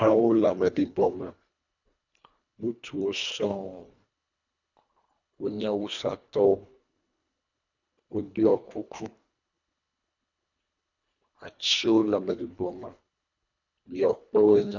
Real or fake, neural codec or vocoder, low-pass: fake; codec, 24 kHz, 1.5 kbps, HILCodec; 7.2 kHz